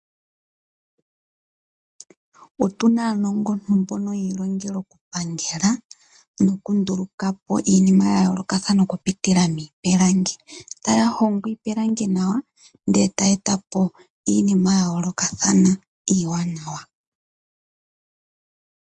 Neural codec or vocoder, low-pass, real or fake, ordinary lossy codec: none; 9.9 kHz; real; MP3, 64 kbps